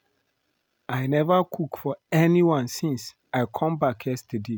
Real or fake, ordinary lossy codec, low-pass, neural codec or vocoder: real; none; none; none